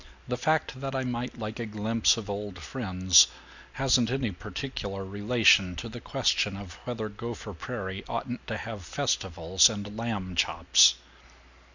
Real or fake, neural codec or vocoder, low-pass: real; none; 7.2 kHz